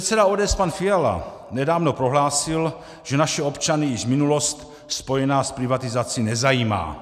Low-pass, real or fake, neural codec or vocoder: 14.4 kHz; real; none